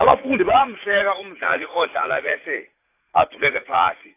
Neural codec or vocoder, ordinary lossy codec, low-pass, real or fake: vocoder, 44.1 kHz, 80 mel bands, Vocos; AAC, 24 kbps; 3.6 kHz; fake